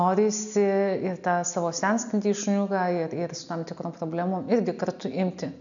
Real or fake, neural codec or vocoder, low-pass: real; none; 7.2 kHz